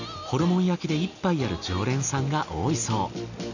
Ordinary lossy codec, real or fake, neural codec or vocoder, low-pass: none; real; none; 7.2 kHz